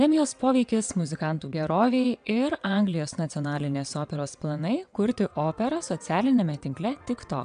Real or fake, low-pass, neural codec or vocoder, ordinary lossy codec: fake; 9.9 kHz; vocoder, 22.05 kHz, 80 mel bands, WaveNeXt; MP3, 96 kbps